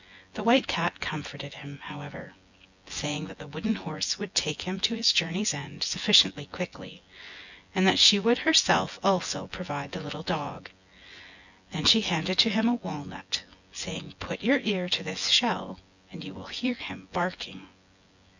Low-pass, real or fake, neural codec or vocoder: 7.2 kHz; fake; vocoder, 24 kHz, 100 mel bands, Vocos